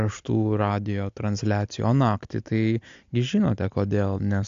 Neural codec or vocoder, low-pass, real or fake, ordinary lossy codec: none; 7.2 kHz; real; AAC, 64 kbps